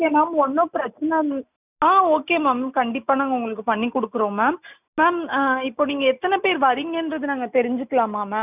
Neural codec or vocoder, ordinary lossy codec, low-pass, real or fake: none; none; 3.6 kHz; real